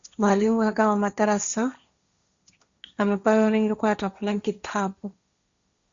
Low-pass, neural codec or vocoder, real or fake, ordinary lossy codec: 7.2 kHz; codec, 16 kHz, 1.1 kbps, Voila-Tokenizer; fake; Opus, 64 kbps